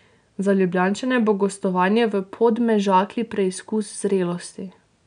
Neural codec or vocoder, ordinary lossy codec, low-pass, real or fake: none; none; 9.9 kHz; real